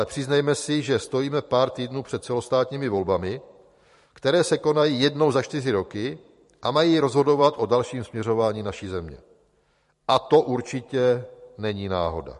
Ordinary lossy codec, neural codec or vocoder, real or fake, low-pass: MP3, 48 kbps; vocoder, 44.1 kHz, 128 mel bands every 512 samples, BigVGAN v2; fake; 14.4 kHz